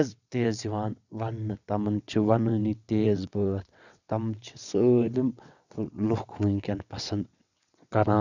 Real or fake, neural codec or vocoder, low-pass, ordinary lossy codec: fake; vocoder, 22.05 kHz, 80 mel bands, WaveNeXt; 7.2 kHz; none